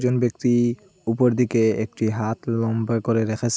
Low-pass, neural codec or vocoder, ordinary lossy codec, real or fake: none; none; none; real